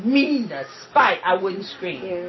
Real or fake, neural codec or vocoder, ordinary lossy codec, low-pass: real; none; MP3, 24 kbps; 7.2 kHz